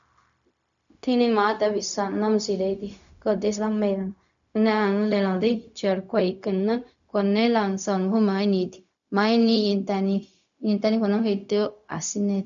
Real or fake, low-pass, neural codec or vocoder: fake; 7.2 kHz; codec, 16 kHz, 0.4 kbps, LongCat-Audio-Codec